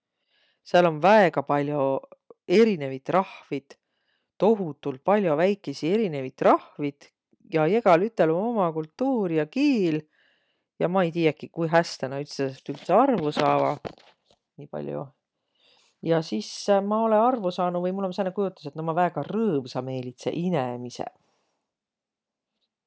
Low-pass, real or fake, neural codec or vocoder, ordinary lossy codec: none; real; none; none